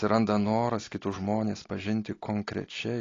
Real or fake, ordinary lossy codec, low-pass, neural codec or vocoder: real; AAC, 32 kbps; 7.2 kHz; none